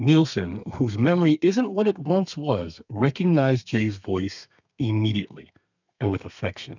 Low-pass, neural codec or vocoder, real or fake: 7.2 kHz; codec, 32 kHz, 1.9 kbps, SNAC; fake